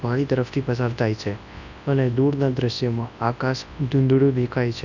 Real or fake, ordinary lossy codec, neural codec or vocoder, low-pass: fake; none; codec, 24 kHz, 0.9 kbps, WavTokenizer, large speech release; 7.2 kHz